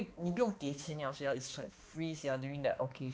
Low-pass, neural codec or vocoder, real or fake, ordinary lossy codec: none; codec, 16 kHz, 2 kbps, X-Codec, HuBERT features, trained on balanced general audio; fake; none